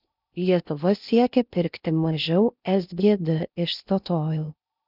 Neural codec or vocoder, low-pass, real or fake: codec, 16 kHz in and 24 kHz out, 0.6 kbps, FocalCodec, streaming, 2048 codes; 5.4 kHz; fake